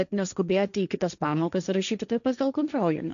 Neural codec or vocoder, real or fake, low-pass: codec, 16 kHz, 1.1 kbps, Voila-Tokenizer; fake; 7.2 kHz